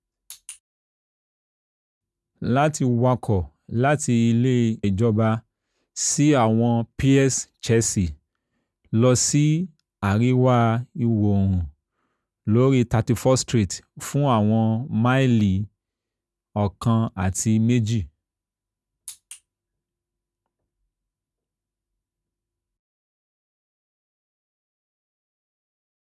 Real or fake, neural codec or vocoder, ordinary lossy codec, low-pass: real; none; none; none